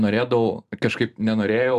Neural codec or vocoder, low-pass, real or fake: vocoder, 44.1 kHz, 128 mel bands every 256 samples, BigVGAN v2; 14.4 kHz; fake